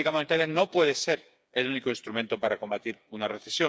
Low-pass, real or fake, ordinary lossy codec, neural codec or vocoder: none; fake; none; codec, 16 kHz, 4 kbps, FreqCodec, smaller model